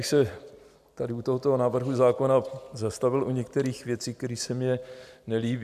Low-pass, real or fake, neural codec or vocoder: 14.4 kHz; real; none